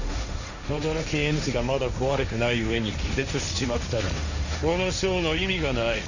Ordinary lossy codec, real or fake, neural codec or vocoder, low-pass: none; fake; codec, 16 kHz, 1.1 kbps, Voila-Tokenizer; 7.2 kHz